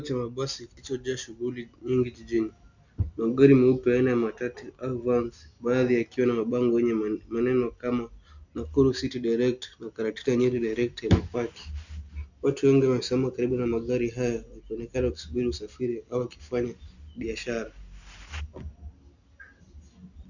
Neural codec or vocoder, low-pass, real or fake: none; 7.2 kHz; real